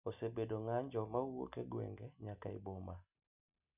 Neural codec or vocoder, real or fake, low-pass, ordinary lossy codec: none; real; 3.6 kHz; none